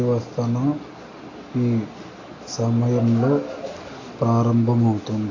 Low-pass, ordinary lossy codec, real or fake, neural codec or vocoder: 7.2 kHz; AAC, 32 kbps; real; none